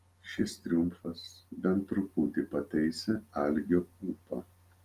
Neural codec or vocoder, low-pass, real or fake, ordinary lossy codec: none; 14.4 kHz; real; Opus, 24 kbps